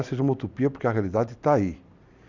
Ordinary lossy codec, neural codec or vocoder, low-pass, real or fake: none; none; 7.2 kHz; real